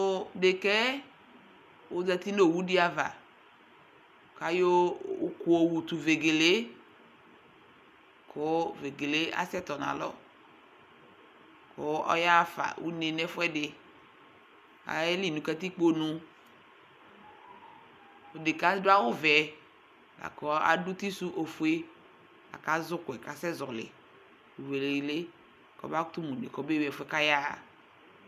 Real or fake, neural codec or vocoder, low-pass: real; none; 14.4 kHz